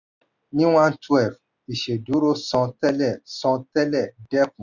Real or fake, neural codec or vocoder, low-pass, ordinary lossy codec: real; none; 7.2 kHz; none